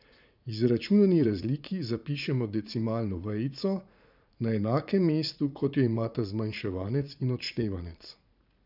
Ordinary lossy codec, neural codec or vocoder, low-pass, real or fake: none; vocoder, 44.1 kHz, 80 mel bands, Vocos; 5.4 kHz; fake